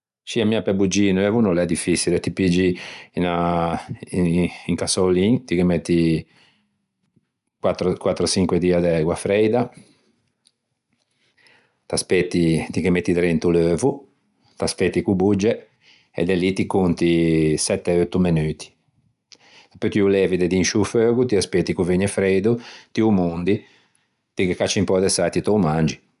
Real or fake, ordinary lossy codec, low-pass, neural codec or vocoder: real; none; 10.8 kHz; none